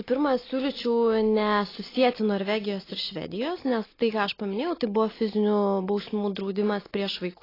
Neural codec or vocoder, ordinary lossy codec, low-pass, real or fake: none; AAC, 24 kbps; 5.4 kHz; real